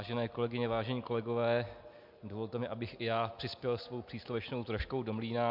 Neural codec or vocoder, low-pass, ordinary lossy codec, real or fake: none; 5.4 kHz; MP3, 48 kbps; real